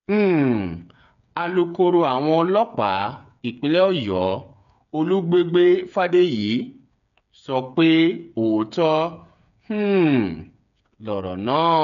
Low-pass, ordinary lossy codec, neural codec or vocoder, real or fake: 7.2 kHz; none; codec, 16 kHz, 8 kbps, FreqCodec, smaller model; fake